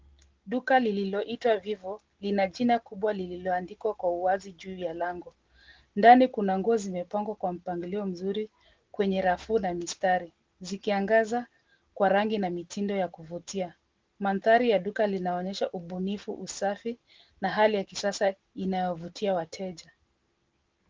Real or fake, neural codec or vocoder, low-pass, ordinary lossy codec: real; none; 7.2 kHz; Opus, 16 kbps